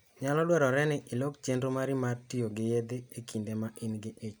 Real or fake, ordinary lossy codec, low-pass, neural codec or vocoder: real; none; none; none